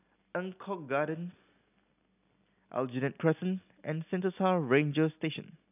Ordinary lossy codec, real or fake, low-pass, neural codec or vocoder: none; real; 3.6 kHz; none